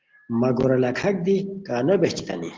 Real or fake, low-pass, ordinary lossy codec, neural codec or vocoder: real; 7.2 kHz; Opus, 16 kbps; none